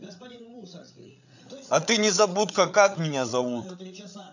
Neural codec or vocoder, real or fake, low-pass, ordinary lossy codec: codec, 16 kHz, 8 kbps, FreqCodec, larger model; fake; 7.2 kHz; none